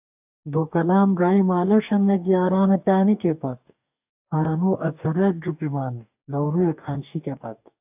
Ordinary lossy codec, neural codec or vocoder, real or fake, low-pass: AAC, 32 kbps; codec, 44.1 kHz, 2.6 kbps, DAC; fake; 3.6 kHz